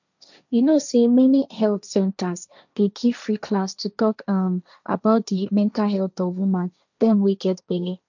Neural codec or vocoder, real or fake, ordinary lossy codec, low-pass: codec, 16 kHz, 1.1 kbps, Voila-Tokenizer; fake; none; 7.2 kHz